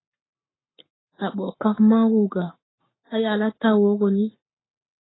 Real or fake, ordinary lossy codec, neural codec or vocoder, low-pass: fake; AAC, 16 kbps; codec, 44.1 kHz, 7.8 kbps, Pupu-Codec; 7.2 kHz